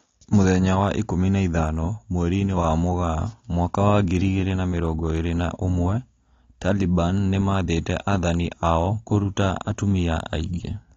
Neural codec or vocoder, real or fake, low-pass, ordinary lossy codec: none; real; 7.2 kHz; AAC, 32 kbps